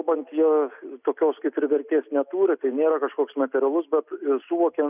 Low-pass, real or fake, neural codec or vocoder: 3.6 kHz; real; none